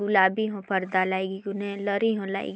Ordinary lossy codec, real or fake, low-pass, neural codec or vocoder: none; real; none; none